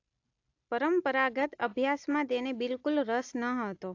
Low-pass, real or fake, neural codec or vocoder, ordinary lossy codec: 7.2 kHz; real; none; AAC, 48 kbps